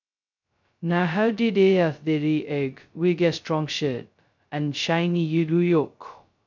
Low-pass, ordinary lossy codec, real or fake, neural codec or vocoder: 7.2 kHz; none; fake; codec, 16 kHz, 0.2 kbps, FocalCodec